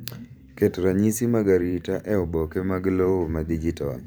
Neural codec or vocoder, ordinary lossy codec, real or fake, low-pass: vocoder, 44.1 kHz, 128 mel bands every 512 samples, BigVGAN v2; none; fake; none